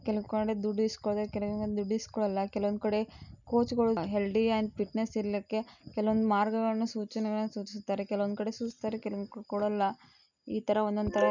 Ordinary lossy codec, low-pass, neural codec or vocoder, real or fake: none; 7.2 kHz; none; real